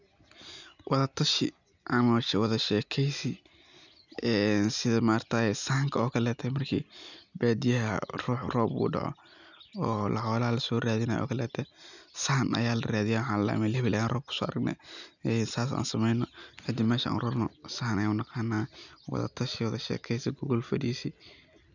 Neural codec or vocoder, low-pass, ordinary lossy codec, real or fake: none; 7.2 kHz; none; real